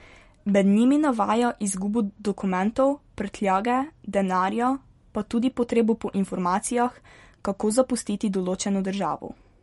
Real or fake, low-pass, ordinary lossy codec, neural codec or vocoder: real; 14.4 kHz; MP3, 48 kbps; none